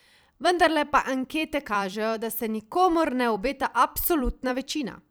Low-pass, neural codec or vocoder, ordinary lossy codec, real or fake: none; vocoder, 44.1 kHz, 128 mel bands every 256 samples, BigVGAN v2; none; fake